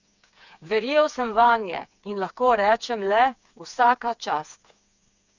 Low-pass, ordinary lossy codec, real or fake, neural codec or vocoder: 7.2 kHz; none; fake; codec, 16 kHz, 4 kbps, FreqCodec, smaller model